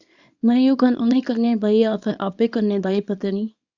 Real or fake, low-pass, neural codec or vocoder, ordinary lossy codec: fake; 7.2 kHz; codec, 24 kHz, 0.9 kbps, WavTokenizer, small release; Opus, 64 kbps